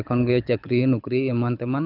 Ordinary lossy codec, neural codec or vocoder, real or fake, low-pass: none; codec, 24 kHz, 6 kbps, HILCodec; fake; 5.4 kHz